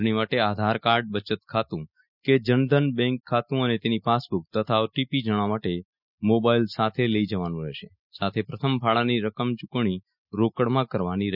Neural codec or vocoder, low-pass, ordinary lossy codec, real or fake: none; 5.4 kHz; none; real